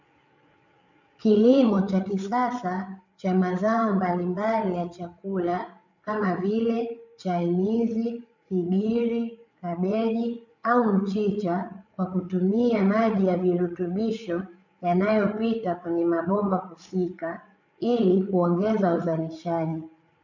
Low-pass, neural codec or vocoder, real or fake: 7.2 kHz; codec, 16 kHz, 8 kbps, FreqCodec, larger model; fake